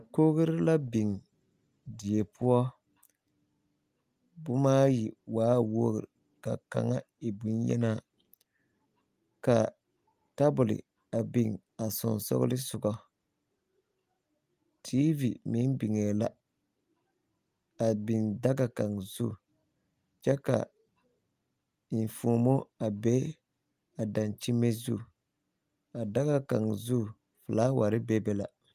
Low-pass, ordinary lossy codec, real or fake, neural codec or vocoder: 14.4 kHz; Opus, 32 kbps; real; none